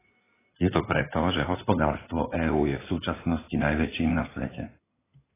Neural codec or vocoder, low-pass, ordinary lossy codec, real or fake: codec, 16 kHz in and 24 kHz out, 2.2 kbps, FireRedTTS-2 codec; 3.6 kHz; AAC, 16 kbps; fake